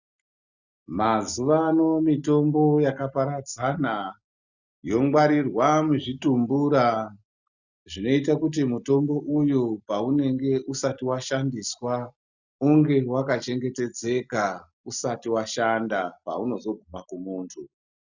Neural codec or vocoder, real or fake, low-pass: none; real; 7.2 kHz